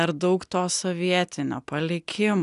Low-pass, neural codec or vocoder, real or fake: 10.8 kHz; none; real